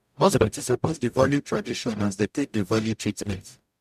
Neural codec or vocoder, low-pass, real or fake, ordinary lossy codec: codec, 44.1 kHz, 0.9 kbps, DAC; 14.4 kHz; fake; MP3, 96 kbps